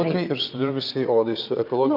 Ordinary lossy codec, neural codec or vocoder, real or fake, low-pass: Opus, 24 kbps; vocoder, 22.05 kHz, 80 mel bands, Vocos; fake; 5.4 kHz